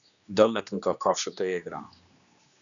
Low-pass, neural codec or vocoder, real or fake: 7.2 kHz; codec, 16 kHz, 2 kbps, X-Codec, HuBERT features, trained on general audio; fake